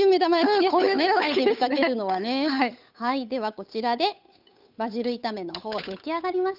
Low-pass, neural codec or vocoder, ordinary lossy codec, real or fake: 5.4 kHz; codec, 16 kHz, 8 kbps, FunCodec, trained on Chinese and English, 25 frames a second; none; fake